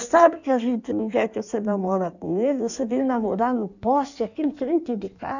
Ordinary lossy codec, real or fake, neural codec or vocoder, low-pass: none; fake; codec, 16 kHz in and 24 kHz out, 1.1 kbps, FireRedTTS-2 codec; 7.2 kHz